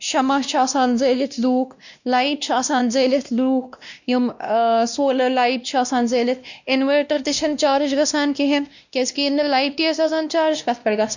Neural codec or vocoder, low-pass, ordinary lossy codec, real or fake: codec, 16 kHz, 1 kbps, X-Codec, WavLM features, trained on Multilingual LibriSpeech; 7.2 kHz; none; fake